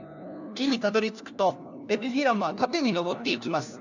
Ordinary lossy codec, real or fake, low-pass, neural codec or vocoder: none; fake; 7.2 kHz; codec, 16 kHz, 1 kbps, FunCodec, trained on LibriTTS, 50 frames a second